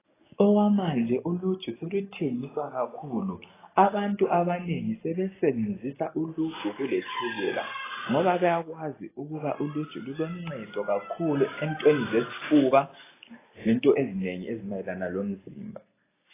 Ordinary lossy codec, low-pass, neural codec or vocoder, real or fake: AAC, 16 kbps; 3.6 kHz; none; real